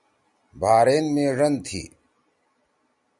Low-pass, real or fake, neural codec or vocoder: 10.8 kHz; real; none